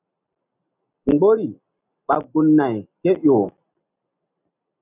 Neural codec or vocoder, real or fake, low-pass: none; real; 3.6 kHz